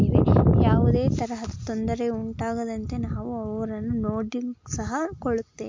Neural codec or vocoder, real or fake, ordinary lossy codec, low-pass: none; real; MP3, 48 kbps; 7.2 kHz